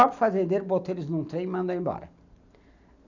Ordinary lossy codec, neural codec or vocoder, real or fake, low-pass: none; none; real; 7.2 kHz